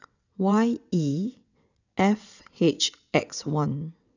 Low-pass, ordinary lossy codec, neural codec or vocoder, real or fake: 7.2 kHz; none; vocoder, 22.05 kHz, 80 mel bands, WaveNeXt; fake